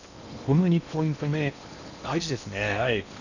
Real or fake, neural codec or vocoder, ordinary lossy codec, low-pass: fake; codec, 16 kHz in and 24 kHz out, 0.8 kbps, FocalCodec, streaming, 65536 codes; none; 7.2 kHz